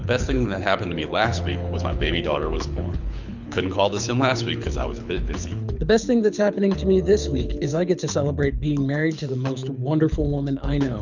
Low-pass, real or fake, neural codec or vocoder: 7.2 kHz; fake; codec, 24 kHz, 6 kbps, HILCodec